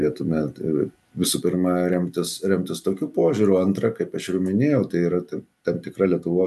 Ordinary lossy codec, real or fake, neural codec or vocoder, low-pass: AAC, 96 kbps; real; none; 14.4 kHz